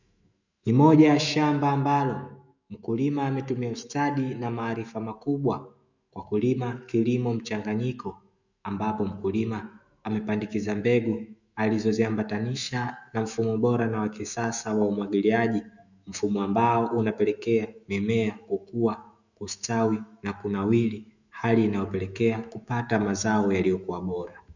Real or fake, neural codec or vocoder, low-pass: fake; autoencoder, 48 kHz, 128 numbers a frame, DAC-VAE, trained on Japanese speech; 7.2 kHz